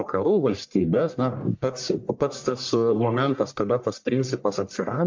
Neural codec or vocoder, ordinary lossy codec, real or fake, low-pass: codec, 44.1 kHz, 1.7 kbps, Pupu-Codec; MP3, 64 kbps; fake; 7.2 kHz